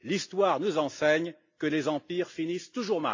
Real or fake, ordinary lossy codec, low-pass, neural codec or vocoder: real; AAC, 48 kbps; 7.2 kHz; none